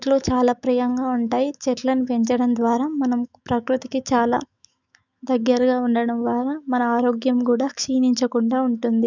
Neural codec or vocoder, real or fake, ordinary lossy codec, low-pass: autoencoder, 48 kHz, 128 numbers a frame, DAC-VAE, trained on Japanese speech; fake; none; 7.2 kHz